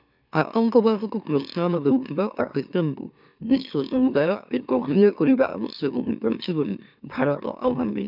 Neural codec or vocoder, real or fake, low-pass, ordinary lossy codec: autoencoder, 44.1 kHz, a latent of 192 numbers a frame, MeloTTS; fake; 5.4 kHz; none